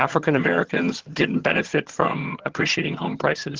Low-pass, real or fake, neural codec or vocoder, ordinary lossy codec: 7.2 kHz; fake; vocoder, 22.05 kHz, 80 mel bands, HiFi-GAN; Opus, 16 kbps